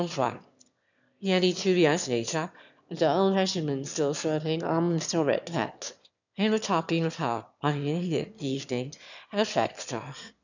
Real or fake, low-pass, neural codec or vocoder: fake; 7.2 kHz; autoencoder, 22.05 kHz, a latent of 192 numbers a frame, VITS, trained on one speaker